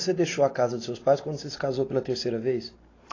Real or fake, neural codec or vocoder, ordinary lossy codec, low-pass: real; none; AAC, 32 kbps; 7.2 kHz